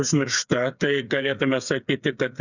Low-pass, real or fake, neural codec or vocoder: 7.2 kHz; fake; codec, 16 kHz, 8 kbps, FreqCodec, smaller model